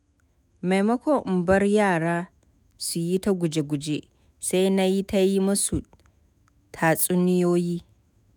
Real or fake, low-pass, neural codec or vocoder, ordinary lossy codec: fake; none; autoencoder, 48 kHz, 128 numbers a frame, DAC-VAE, trained on Japanese speech; none